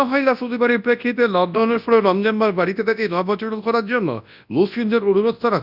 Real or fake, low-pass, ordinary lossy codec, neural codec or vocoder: fake; 5.4 kHz; none; codec, 24 kHz, 0.9 kbps, WavTokenizer, large speech release